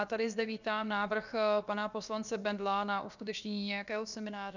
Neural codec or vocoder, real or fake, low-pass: codec, 16 kHz, about 1 kbps, DyCAST, with the encoder's durations; fake; 7.2 kHz